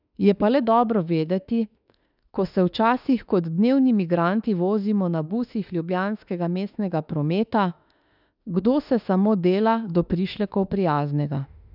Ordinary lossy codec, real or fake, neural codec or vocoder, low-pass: none; fake; autoencoder, 48 kHz, 32 numbers a frame, DAC-VAE, trained on Japanese speech; 5.4 kHz